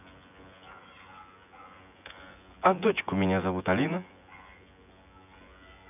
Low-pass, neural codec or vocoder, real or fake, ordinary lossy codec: 3.6 kHz; vocoder, 24 kHz, 100 mel bands, Vocos; fake; none